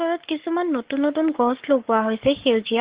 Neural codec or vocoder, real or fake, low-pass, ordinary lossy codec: codec, 44.1 kHz, 7.8 kbps, Pupu-Codec; fake; 3.6 kHz; Opus, 16 kbps